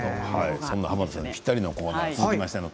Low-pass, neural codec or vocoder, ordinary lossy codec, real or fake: none; none; none; real